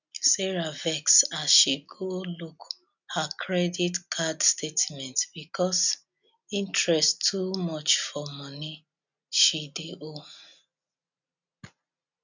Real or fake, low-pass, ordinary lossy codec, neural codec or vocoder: real; 7.2 kHz; none; none